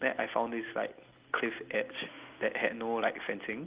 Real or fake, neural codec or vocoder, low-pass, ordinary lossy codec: real; none; 3.6 kHz; Opus, 32 kbps